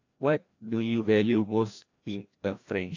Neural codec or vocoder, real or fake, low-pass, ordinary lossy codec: codec, 16 kHz, 1 kbps, FreqCodec, larger model; fake; 7.2 kHz; AAC, 48 kbps